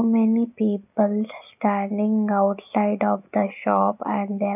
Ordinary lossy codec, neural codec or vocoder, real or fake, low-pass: none; none; real; 3.6 kHz